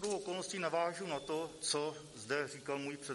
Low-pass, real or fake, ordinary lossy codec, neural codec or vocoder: 19.8 kHz; real; MP3, 48 kbps; none